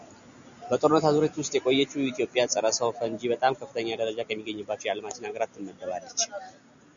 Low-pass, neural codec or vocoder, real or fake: 7.2 kHz; none; real